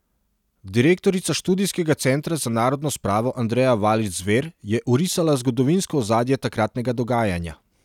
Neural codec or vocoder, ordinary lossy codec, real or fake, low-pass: none; none; real; 19.8 kHz